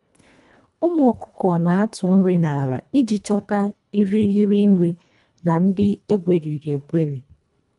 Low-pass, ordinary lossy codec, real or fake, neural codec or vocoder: 10.8 kHz; none; fake; codec, 24 kHz, 1.5 kbps, HILCodec